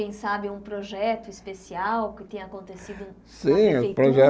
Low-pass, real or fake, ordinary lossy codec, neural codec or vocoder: none; real; none; none